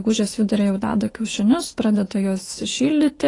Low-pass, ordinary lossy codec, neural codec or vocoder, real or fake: 10.8 kHz; AAC, 32 kbps; autoencoder, 48 kHz, 128 numbers a frame, DAC-VAE, trained on Japanese speech; fake